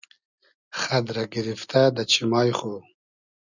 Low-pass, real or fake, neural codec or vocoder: 7.2 kHz; real; none